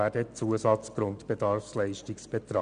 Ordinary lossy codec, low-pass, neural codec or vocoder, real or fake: none; 9.9 kHz; none; real